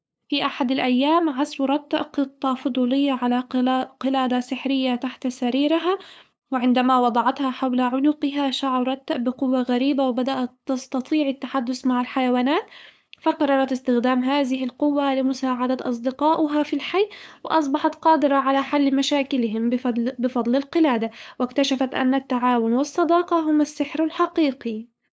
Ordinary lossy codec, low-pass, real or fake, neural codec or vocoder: none; none; fake; codec, 16 kHz, 8 kbps, FunCodec, trained on LibriTTS, 25 frames a second